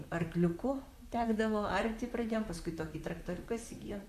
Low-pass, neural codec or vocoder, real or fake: 14.4 kHz; vocoder, 44.1 kHz, 128 mel bands, Pupu-Vocoder; fake